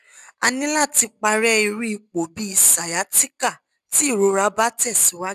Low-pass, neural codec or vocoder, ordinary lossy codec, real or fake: 14.4 kHz; none; none; real